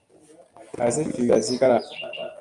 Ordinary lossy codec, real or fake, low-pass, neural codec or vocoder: Opus, 32 kbps; real; 10.8 kHz; none